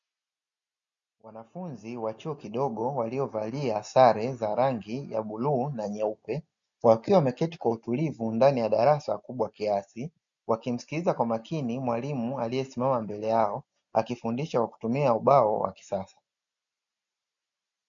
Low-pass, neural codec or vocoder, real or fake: 7.2 kHz; none; real